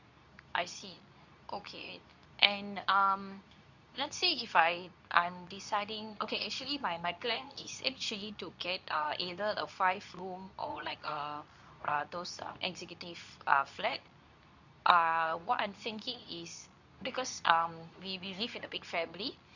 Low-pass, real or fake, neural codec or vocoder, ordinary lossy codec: 7.2 kHz; fake; codec, 24 kHz, 0.9 kbps, WavTokenizer, medium speech release version 2; none